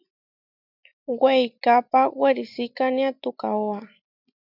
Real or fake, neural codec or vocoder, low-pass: real; none; 5.4 kHz